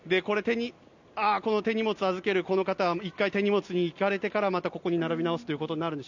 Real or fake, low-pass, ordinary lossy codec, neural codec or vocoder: real; 7.2 kHz; none; none